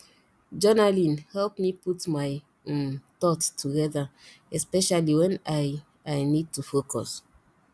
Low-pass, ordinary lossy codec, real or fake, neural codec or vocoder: none; none; real; none